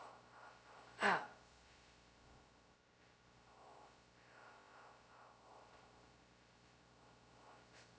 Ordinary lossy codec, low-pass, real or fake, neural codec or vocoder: none; none; fake; codec, 16 kHz, 0.2 kbps, FocalCodec